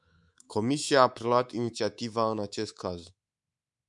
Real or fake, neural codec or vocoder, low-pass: fake; codec, 24 kHz, 3.1 kbps, DualCodec; 10.8 kHz